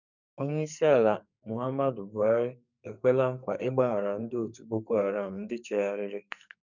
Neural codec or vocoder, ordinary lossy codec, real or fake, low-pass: codec, 44.1 kHz, 2.6 kbps, SNAC; none; fake; 7.2 kHz